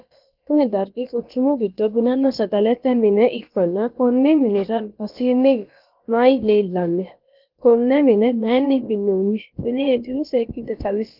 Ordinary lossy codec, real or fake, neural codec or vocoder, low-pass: Opus, 32 kbps; fake; codec, 16 kHz, about 1 kbps, DyCAST, with the encoder's durations; 5.4 kHz